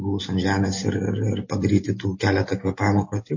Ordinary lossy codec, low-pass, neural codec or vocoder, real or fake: MP3, 32 kbps; 7.2 kHz; none; real